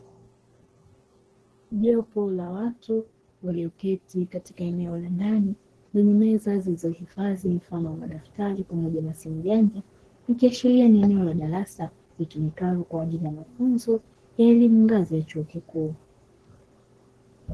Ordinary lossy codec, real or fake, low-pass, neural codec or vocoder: Opus, 16 kbps; fake; 10.8 kHz; codec, 44.1 kHz, 3.4 kbps, Pupu-Codec